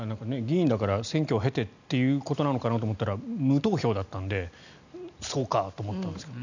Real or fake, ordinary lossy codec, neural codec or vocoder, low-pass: real; none; none; 7.2 kHz